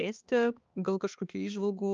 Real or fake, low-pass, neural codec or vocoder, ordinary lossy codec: fake; 7.2 kHz; codec, 16 kHz, 2 kbps, X-Codec, HuBERT features, trained on balanced general audio; Opus, 24 kbps